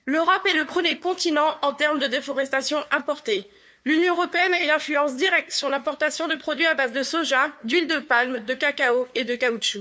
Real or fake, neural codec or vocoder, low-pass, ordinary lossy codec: fake; codec, 16 kHz, 2 kbps, FunCodec, trained on LibriTTS, 25 frames a second; none; none